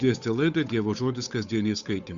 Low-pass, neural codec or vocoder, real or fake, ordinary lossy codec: 7.2 kHz; codec, 16 kHz, 8 kbps, FunCodec, trained on Chinese and English, 25 frames a second; fake; Opus, 64 kbps